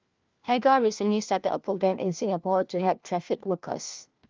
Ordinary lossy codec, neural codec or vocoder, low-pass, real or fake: Opus, 24 kbps; codec, 16 kHz, 1 kbps, FunCodec, trained on LibriTTS, 50 frames a second; 7.2 kHz; fake